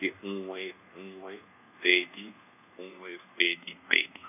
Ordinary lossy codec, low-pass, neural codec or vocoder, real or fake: none; 3.6 kHz; codec, 24 kHz, 1.2 kbps, DualCodec; fake